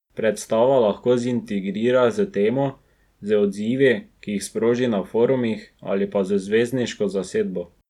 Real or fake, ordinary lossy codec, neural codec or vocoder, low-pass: real; none; none; 19.8 kHz